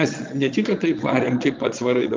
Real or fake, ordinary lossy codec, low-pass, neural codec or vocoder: fake; Opus, 32 kbps; 7.2 kHz; vocoder, 22.05 kHz, 80 mel bands, Vocos